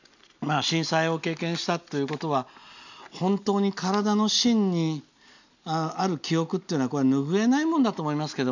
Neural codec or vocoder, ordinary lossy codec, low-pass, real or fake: none; none; 7.2 kHz; real